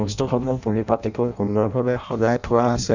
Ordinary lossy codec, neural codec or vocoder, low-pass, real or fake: none; codec, 16 kHz in and 24 kHz out, 0.6 kbps, FireRedTTS-2 codec; 7.2 kHz; fake